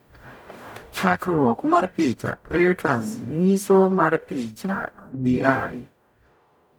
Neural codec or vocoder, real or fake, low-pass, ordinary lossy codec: codec, 44.1 kHz, 0.9 kbps, DAC; fake; none; none